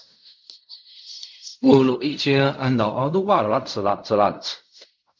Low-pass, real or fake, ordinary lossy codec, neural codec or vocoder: 7.2 kHz; fake; MP3, 64 kbps; codec, 16 kHz in and 24 kHz out, 0.4 kbps, LongCat-Audio-Codec, fine tuned four codebook decoder